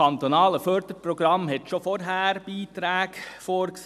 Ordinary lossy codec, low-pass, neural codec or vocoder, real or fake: none; 14.4 kHz; none; real